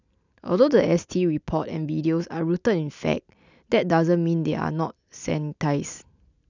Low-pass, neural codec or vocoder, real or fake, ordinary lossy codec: 7.2 kHz; none; real; none